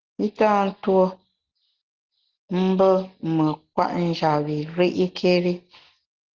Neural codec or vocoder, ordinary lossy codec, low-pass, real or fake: none; Opus, 16 kbps; 7.2 kHz; real